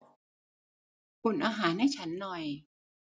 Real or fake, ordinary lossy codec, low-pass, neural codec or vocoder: real; none; none; none